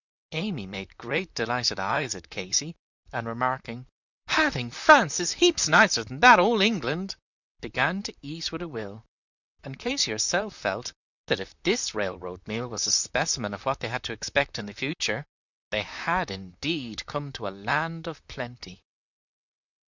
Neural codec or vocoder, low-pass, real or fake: vocoder, 44.1 kHz, 128 mel bands, Pupu-Vocoder; 7.2 kHz; fake